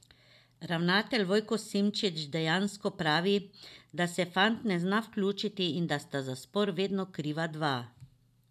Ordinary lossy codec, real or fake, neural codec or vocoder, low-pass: none; real; none; 14.4 kHz